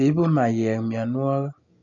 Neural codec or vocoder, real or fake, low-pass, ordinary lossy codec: none; real; 7.2 kHz; none